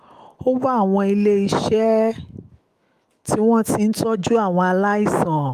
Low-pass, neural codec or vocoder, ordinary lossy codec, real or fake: 14.4 kHz; autoencoder, 48 kHz, 128 numbers a frame, DAC-VAE, trained on Japanese speech; Opus, 32 kbps; fake